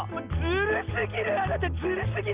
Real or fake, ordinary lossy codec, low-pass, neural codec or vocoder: real; Opus, 32 kbps; 3.6 kHz; none